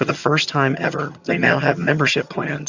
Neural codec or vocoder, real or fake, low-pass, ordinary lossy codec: vocoder, 22.05 kHz, 80 mel bands, HiFi-GAN; fake; 7.2 kHz; Opus, 64 kbps